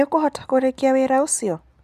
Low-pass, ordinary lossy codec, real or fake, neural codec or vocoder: 14.4 kHz; none; real; none